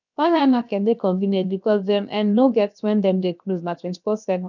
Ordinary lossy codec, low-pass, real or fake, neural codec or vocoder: none; 7.2 kHz; fake; codec, 16 kHz, 0.7 kbps, FocalCodec